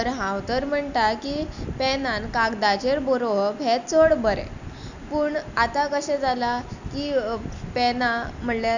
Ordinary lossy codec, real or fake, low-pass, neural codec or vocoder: none; real; 7.2 kHz; none